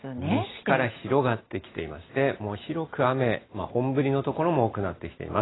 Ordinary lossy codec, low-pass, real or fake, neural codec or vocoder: AAC, 16 kbps; 7.2 kHz; real; none